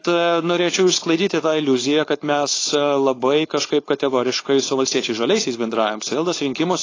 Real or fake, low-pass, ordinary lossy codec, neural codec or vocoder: fake; 7.2 kHz; AAC, 32 kbps; codec, 16 kHz, 4.8 kbps, FACodec